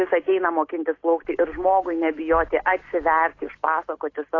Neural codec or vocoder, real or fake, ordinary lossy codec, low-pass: none; real; AAC, 32 kbps; 7.2 kHz